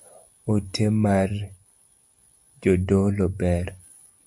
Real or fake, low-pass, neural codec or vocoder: real; 10.8 kHz; none